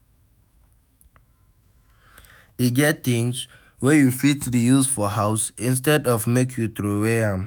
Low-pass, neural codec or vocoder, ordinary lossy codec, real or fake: none; autoencoder, 48 kHz, 128 numbers a frame, DAC-VAE, trained on Japanese speech; none; fake